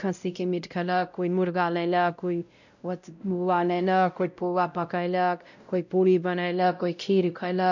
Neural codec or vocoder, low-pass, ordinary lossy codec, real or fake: codec, 16 kHz, 0.5 kbps, X-Codec, WavLM features, trained on Multilingual LibriSpeech; 7.2 kHz; none; fake